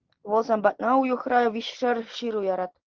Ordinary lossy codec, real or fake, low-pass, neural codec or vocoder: Opus, 16 kbps; real; 7.2 kHz; none